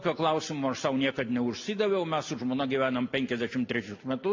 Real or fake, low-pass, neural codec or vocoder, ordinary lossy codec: real; 7.2 kHz; none; MP3, 48 kbps